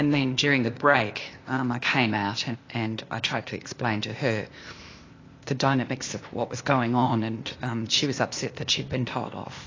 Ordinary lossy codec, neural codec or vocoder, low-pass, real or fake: AAC, 32 kbps; codec, 16 kHz, 0.8 kbps, ZipCodec; 7.2 kHz; fake